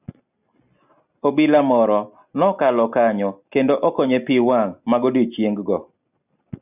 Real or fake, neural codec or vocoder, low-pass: real; none; 3.6 kHz